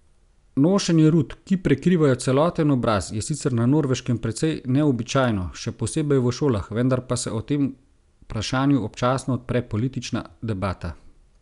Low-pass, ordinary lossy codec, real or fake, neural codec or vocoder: 10.8 kHz; none; real; none